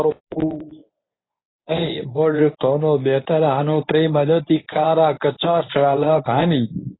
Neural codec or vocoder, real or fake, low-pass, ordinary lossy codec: codec, 24 kHz, 0.9 kbps, WavTokenizer, medium speech release version 1; fake; 7.2 kHz; AAC, 16 kbps